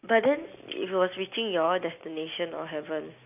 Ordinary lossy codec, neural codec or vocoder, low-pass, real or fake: none; none; 3.6 kHz; real